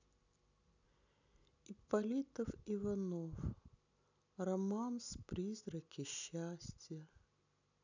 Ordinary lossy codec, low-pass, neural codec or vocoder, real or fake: none; 7.2 kHz; none; real